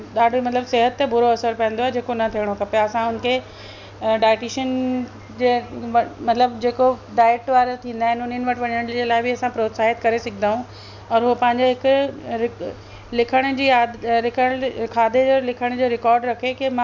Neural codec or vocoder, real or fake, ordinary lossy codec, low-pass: none; real; none; 7.2 kHz